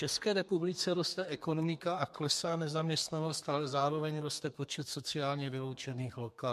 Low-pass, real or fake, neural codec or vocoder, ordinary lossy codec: 14.4 kHz; fake; codec, 32 kHz, 1.9 kbps, SNAC; MP3, 64 kbps